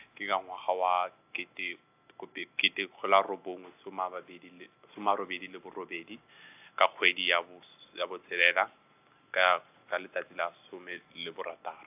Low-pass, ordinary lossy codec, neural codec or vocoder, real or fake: 3.6 kHz; AAC, 32 kbps; none; real